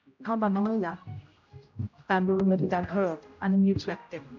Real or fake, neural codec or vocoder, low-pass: fake; codec, 16 kHz, 0.5 kbps, X-Codec, HuBERT features, trained on general audio; 7.2 kHz